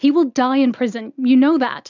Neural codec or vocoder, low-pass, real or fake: none; 7.2 kHz; real